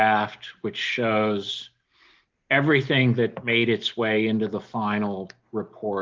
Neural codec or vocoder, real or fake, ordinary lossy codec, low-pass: none; real; Opus, 32 kbps; 7.2 kHz